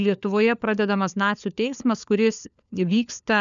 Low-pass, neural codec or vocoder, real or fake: 7.2 kHz; codec, 16 kHz, 4 kbps, FunCodec, trained on LibriTTS, 50 frames a second; fake